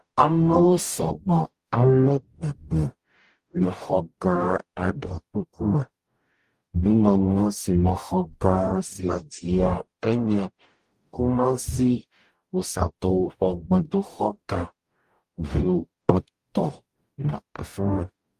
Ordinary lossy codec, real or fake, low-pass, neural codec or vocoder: Opus, 32 kbps; fake; 14.4 kHz; codec, 44.1 kHz, 0.9 kbps, DAC